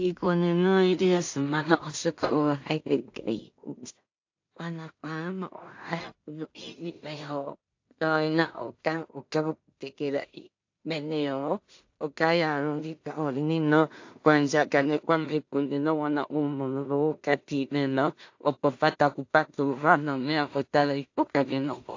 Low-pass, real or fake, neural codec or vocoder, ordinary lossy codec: 7.2 kHz; fake; codec, 16 kHz in and 24 kHz out, 0.4 kbps, LongCat-Audio-Codec, two codebook decoder; AAC, 48 kbps